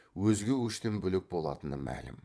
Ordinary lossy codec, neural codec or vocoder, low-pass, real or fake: none; vocoder, 22.05 kHz, 80 mel bands, WaveNeXt; none; fake